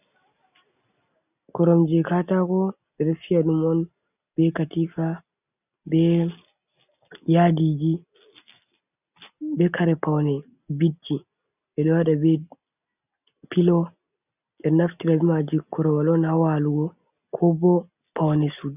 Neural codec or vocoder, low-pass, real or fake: none; 3.6 kHz; real